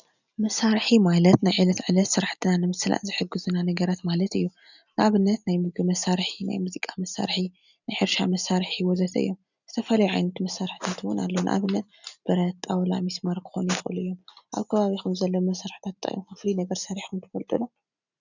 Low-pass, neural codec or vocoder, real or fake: 7.2 kHz; none; real